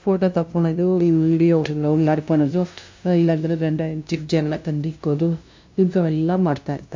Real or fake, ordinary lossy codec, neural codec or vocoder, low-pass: fake; MP3, 48 kbps; codec, 16 kHz, 0.5 kbps, FunCodec, trained on LibriTTS, 25 frames a second; 7.2 kHz